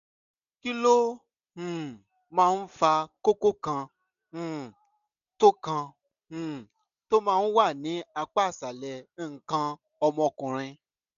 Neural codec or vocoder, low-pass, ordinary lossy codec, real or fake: none; 7.2 kHz; none; real